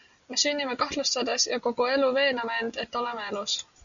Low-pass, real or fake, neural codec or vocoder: 7.2 kHz; real; none